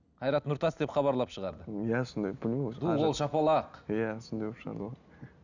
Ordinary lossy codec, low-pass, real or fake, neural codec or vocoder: none; 7.2 kHz; real; none